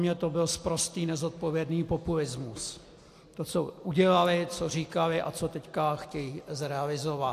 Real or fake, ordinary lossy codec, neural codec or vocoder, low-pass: real; AAC, 64 kbps; none; 14.4 kHz